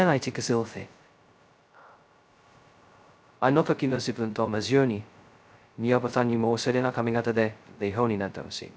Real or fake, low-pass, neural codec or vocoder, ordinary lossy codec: fake; none; codec, 16 kHz, 0.2 kbps, FocalCodec; none